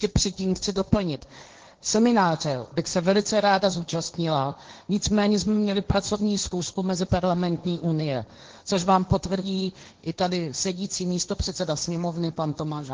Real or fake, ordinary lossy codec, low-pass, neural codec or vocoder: fake; Opus, 16 kbps; 7.2 kHz; codec, 16 kHz, 1.1 kbps, Voila-Tokenizer